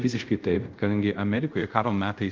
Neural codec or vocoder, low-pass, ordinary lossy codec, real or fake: codec, 24 kHz, 0.5 kbps, DualCodec; 7.2 kHz; Opus, 24 kbps; fake